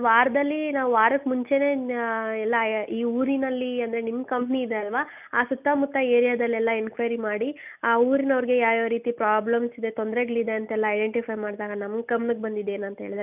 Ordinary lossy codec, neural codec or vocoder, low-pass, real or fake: none; none; 3.6 kHz; real